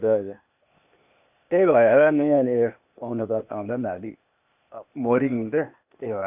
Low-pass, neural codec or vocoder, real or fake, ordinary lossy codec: 3.6 kHz; codec, 16 kHz, 0.8 kbps, ZipCodec; fake; none